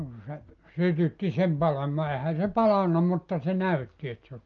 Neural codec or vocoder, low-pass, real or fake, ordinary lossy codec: none; 7.2 kHz; real; Opus, 24 kbps